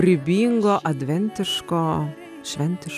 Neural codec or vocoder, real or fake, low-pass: none; real; 14.4 kHz